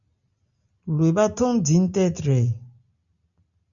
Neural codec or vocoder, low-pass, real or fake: none; 7.2 kHz; real